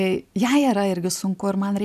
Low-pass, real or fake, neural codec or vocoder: 14.4 kHz; real; none